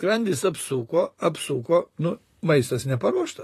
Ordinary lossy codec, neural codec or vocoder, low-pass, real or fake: AAC, 48 kbps; vocoder, 44.1 kHz, 128 mel bands, Pupu-Vocoder; 14.4 kHz; fake